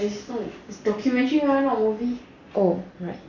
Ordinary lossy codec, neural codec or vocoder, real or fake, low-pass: none; none; real; 7.2 kHz